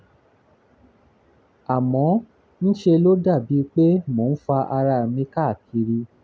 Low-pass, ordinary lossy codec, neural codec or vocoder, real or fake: none; none; none; real